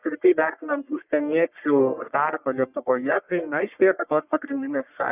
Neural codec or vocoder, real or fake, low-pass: codec, 44.1 kHz, 1.7 kbps, Pupu-Codec; fake; 3.6 kHz